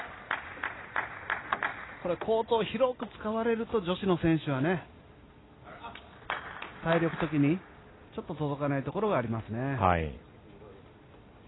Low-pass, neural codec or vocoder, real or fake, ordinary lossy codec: 7.2 kHz; none; real; AAC, 16 kbps